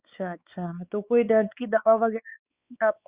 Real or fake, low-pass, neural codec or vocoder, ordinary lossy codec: fake; 3.6 kHz; codec, 16 kHz, 4 kbps, X-Codec, WavLM features, trained on Multilingual LibriSpeech; Opus, 64 kbps